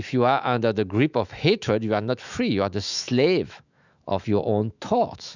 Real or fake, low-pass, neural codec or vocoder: fake; 7.2 kHz; autoencoder, 48 kHz, 128 numbers a frame, DAC-VAE, trained on Japanese speech